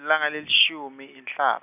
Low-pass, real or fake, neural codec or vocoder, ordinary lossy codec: 3.6 kHz; real; none; none